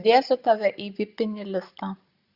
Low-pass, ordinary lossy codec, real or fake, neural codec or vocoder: 5.4 kHz; Opus, 64 kbps; real; none